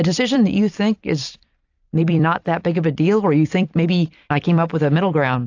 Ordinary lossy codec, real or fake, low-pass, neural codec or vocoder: AAC, 48 kbps; fake; 7.2 kHz; vocoder, 44.1 kHz, 128 mel bands every 256 samples, BigVGAN v2